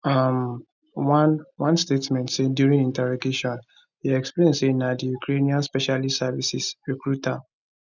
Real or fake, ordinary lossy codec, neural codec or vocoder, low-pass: real; none; none; 7.2 kHz